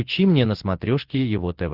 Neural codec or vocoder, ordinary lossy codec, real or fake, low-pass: none; Opus, 16 kbps; real; 5.4 kHz